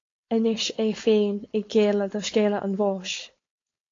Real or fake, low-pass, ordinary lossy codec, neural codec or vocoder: fake; 7.2 kHz; AAC, 32 kbps; codec, 16 kHz, 4.8 kbps, FACodec